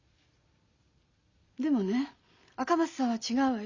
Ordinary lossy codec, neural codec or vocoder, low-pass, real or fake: Opus, 64 kbps; none; 7.2 kHz; real